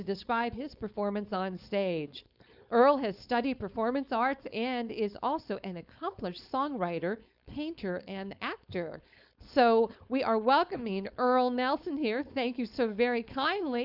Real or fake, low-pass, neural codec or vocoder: fake; 5.4 kHz; codec, 16 kHz, 4.8 kbps, FACodec